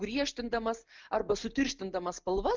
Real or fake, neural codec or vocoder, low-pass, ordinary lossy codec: real; none; 7.2 kHz; Opus, 24 kbps